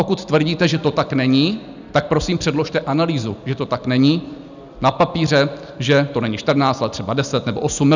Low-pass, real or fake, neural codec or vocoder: 7.2 kHz; real; none